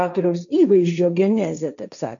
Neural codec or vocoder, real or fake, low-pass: codec, 16 kHz, 1.1 kbps, Voila-Tokenizer; fake; 7.2 kHz